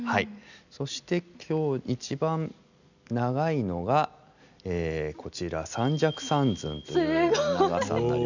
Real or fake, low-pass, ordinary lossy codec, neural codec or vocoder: real; 7.2 kHz; none; none